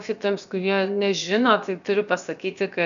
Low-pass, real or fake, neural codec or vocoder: 7.2 kHz; fake; codec, 16 kHz, about 1 kbps, DyCAST, with the encoder's durations